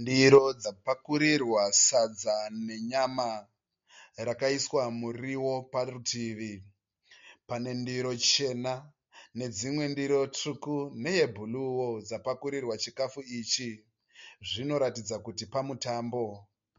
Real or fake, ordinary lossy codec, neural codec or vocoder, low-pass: real; MP3, 48 kbps; none; 7.2 kHz